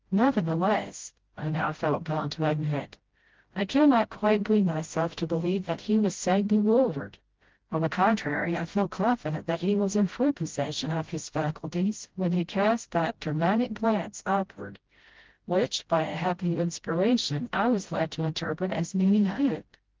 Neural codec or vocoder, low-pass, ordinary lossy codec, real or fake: codec, 16 kHz, 0.5 kbps, FreqCodec, smaller model; 7.2 kHz; Opus, 16 kbps; fake